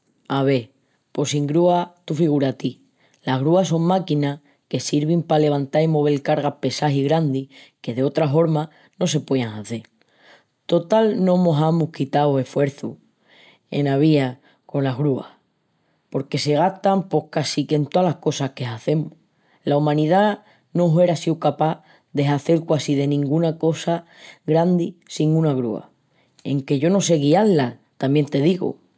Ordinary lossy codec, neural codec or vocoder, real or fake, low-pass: none; none; real; none